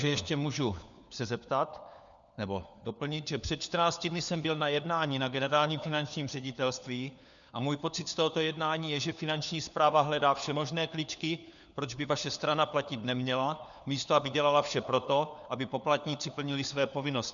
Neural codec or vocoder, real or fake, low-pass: codec, 16 kHz, 4 kbps, FunCodec, trained on LibriTTS, 50 frames a second; fake; 7.2 kHz